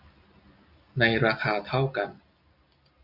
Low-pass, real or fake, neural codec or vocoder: 5.4 kHz; real; none